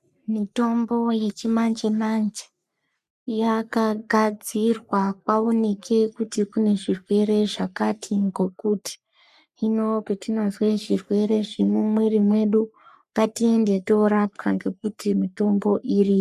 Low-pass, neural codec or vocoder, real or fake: 14.4 kHz; codec, 44.1 kHz, 3.4 kbps, Pupu-Codec; fake